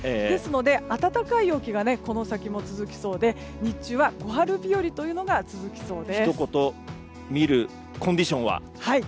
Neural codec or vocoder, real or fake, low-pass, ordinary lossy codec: none; real; none; none